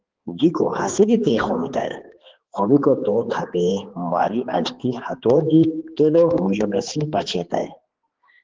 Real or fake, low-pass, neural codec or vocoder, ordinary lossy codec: fake; 7.2 kHz; codec, 16 kHz, 2 kbps, X-Codec, HuBERT features, trained on balanced general audio; Opus, 16 kbps